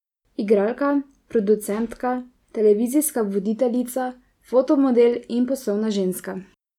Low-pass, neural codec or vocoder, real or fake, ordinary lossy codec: 19.8 kHz; none; real; none